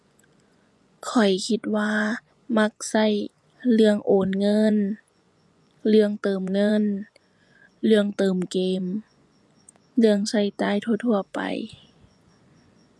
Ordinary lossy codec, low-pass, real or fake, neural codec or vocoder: none; none; fake; vocoder, 24 kHz, 100 mel bands, Vocos